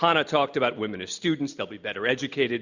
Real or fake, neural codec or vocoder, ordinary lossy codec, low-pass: real; none; Opus, 64 kbps; 7.2 kHz